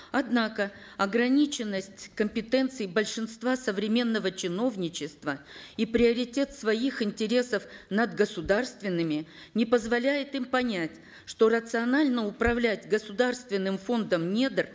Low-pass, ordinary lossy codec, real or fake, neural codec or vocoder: none; none; real; none